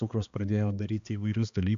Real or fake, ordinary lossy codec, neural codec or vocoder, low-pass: fake; MP3, 64 kbps; codec, 16 kHz, 2 kbps, X-Codec, HuBERT features, trained on balanced general audio; 7.2 kHz